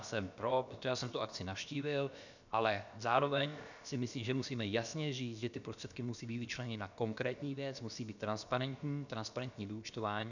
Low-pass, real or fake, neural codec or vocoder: 7.2 kHz; fake; codec, 16 kHz, about 1 kbps, DyCAST, with the encoder's durations